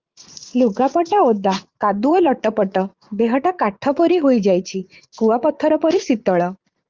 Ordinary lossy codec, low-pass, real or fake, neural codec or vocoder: Opus, 32 kbps; 7.2 kHz; fake; vocoder, 44.1 kHz, 80 mel bands, Vocos